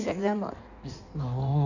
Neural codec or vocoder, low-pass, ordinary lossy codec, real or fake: codec, 16 kHz in and 24 kHz out, 1.1 kbps, FireRedTTS-2 codec; 7.2 kHz; none; fake